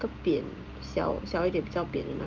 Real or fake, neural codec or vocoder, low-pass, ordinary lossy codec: real; none; 7.2 kHz; Opus, 32 kbps